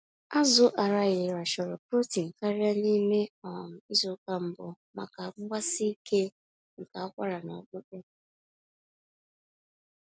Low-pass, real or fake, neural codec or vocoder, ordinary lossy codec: none; real; none; none